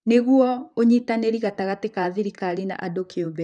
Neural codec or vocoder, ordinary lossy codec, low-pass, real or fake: vocoder, 44.1 kHz, 128 mel bands, Pupu-Vocoder; none; 10.8 kHz; fake